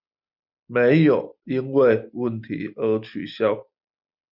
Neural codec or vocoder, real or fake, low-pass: none; real; 5.4 kHz